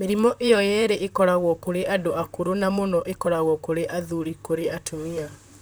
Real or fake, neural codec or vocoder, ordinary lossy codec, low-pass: fake; vocoder, 44.1 kHz, 128 mel bands, Pupu-Vocoder; none; none